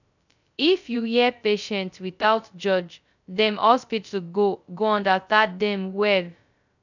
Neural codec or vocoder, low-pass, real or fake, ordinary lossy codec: codec, 16 kHz, 0.2 kbps, FocalCodec; 7.2 kHz; fake; none